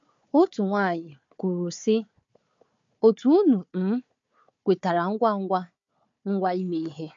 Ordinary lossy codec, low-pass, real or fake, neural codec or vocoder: MP3, 48 kbps; 7.2 kHz; fake; codec, 16 kHz, 4 kbps, FunCodec, trained on Chinese and English, 50 frames a second